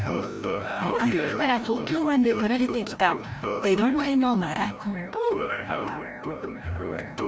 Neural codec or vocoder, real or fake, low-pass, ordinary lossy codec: codec, 16 kHz, 0.5 kbps, FreqCodec, larger model; fake; none; none